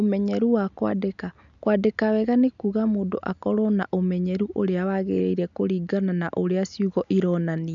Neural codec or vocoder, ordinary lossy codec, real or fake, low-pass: none; none; real; 7.2 kHz